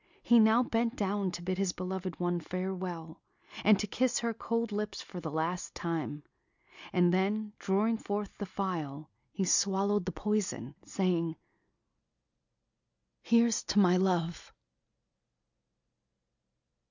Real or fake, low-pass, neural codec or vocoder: real; 7.2 kHz; none